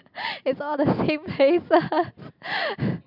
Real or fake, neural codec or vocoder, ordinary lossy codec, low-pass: real; none; none; 5.4 kHz